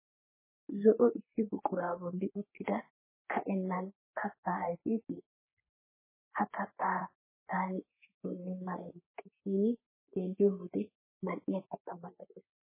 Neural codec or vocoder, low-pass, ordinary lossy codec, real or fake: codec, 44.1 kHz, 3.4 kbps, Pupu-Codec; 3.6 kHz; MP3, 16 kbps; fake